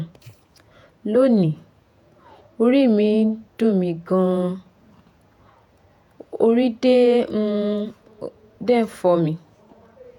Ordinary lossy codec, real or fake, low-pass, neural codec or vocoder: none; fake; 19.8 kHz; vocoder, 48 kHz, 128 mel bands, Vocos